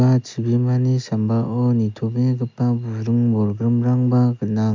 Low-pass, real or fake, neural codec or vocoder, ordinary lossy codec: 7.2 kHz; real; none; MP3, 64 kbps